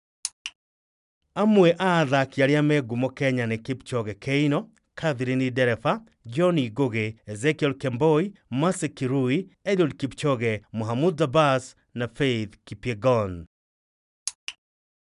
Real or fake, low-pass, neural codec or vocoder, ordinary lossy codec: real; 10.8 kHz; none; none